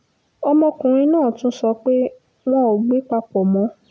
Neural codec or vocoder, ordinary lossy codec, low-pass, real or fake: none; none; none; real